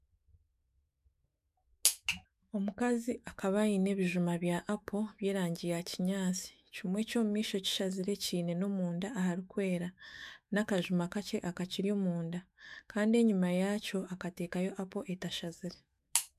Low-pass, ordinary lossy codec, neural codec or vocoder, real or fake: 14.4 kHz; MP3, 96 kbps; autoencoder, 48 kHz, 128 numbers a frame, DAC-VAE, trained on Japanese speech; fake